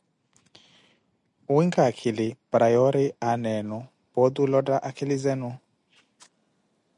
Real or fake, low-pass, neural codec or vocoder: real; 10.8 kHz; none